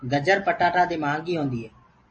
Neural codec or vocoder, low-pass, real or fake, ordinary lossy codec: none; 10.8 kHz; real; MP3, 32 kbps